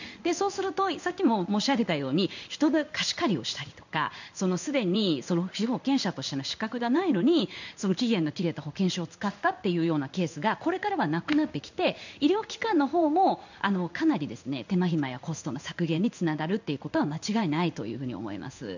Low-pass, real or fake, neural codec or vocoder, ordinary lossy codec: 7.2 kHz; fake; codec, 16 kHz in and 24 kHz out, 1 kbps, XY-Tokenizer; none